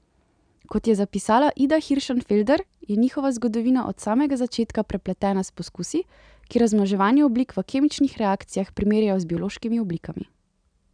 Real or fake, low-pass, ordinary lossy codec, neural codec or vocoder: real; 9.9 kHz; none; none